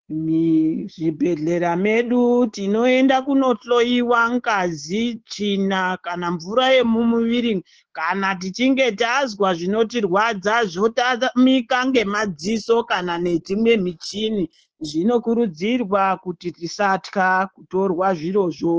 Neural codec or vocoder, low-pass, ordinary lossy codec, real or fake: codec, 24 kHz, 3.1 kbps, DualCodec; 7.2 kHz; Opus, 16 kbps; fake